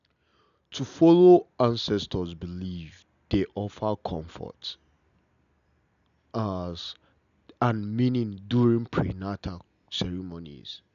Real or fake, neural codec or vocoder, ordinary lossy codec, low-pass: real; none; none; 7.2 kHz